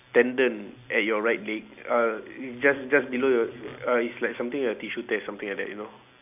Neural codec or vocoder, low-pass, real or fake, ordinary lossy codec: none; 3.6 kHz; real; none